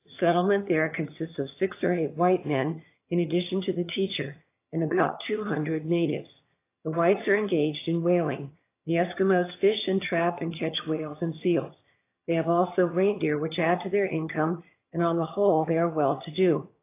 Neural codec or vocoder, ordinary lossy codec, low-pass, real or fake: vocoder, 22.05 kHz, 80 mel bands, HiFi-GAN; AAC, 24 kbps; 3.6 kHz; fake